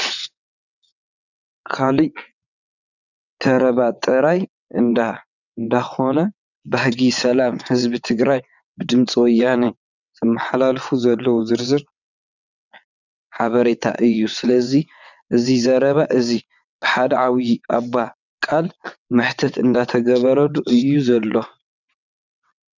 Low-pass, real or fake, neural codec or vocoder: 7.2 kHz; fake; vocoder, 22.05 kHz, 80 mel bands, WaveNeXt